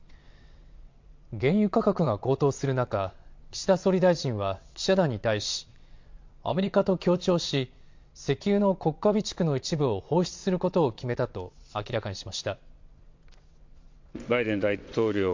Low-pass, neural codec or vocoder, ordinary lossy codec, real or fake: 7.2 kHz; vocoder, 22.05 kHz, 80 mel bands, WaveNeXt; MP3, 48 kbps; fake